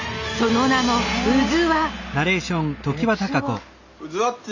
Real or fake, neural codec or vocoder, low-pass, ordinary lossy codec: real; none; 7.2 kHz; none